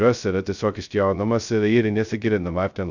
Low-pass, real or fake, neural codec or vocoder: 7.2 kHz; fake; codec, 16 kHz, 0.2 kbps, FocalCodec